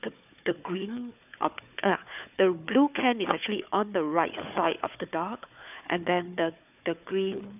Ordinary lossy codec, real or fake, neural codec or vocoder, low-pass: none; fake; codec, 16 kHz, 4 kbps, FunCodec, trained on Chinese and English, 50 frames a second; 3.6 kHz